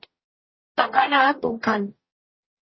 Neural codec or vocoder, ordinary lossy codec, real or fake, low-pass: codec, 44.1 kHz, 0.9 kbps, DAC; MP3, 24 kbps; fake; 7.2 kHz